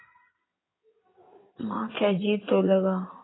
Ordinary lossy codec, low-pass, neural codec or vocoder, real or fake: AAC, 16 kbps; 7.2 kHz; codec, 16 kHz in and 24 kHz out, 2.2 kbps, FireRedTTS-2 codec; fake